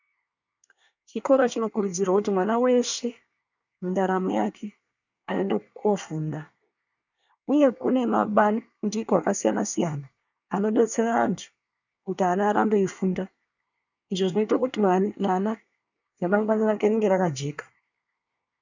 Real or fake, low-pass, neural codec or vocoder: fake; 7.2 kHz; codec, 24 kHz, 1 kbps, SNAC